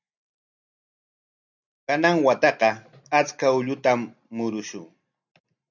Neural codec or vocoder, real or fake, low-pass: none; real; 7.2 kHz